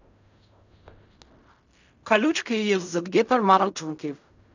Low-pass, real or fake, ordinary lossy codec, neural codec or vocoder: 7.2 kHz; fake; none; codec, 16 kHz in and 24 kHz out, 0.4 kbps, LongCat-Audio-Codec, fine tuned four codebook decoder